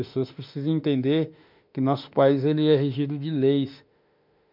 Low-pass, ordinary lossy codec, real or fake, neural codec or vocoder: 5.4 kHz; MP3, 48 kbps; fake; autoencoder, 48 kHz, 32 numbers a frame, DAC-VAE, trained on Japanese speech